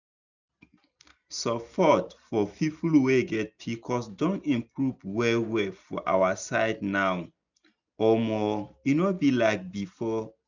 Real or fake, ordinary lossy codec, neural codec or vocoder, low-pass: real; none; none; 7.2 kHz